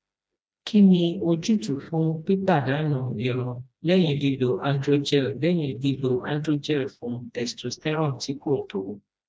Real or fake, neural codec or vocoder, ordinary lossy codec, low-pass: fake; codec, 16 kHz, 1 kbps, FreqCodec, smaller model; none; none